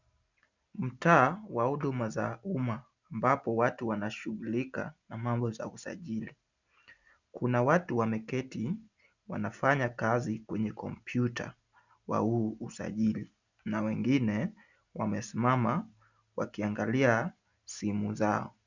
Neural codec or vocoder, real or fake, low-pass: none; real; 7.2 kHz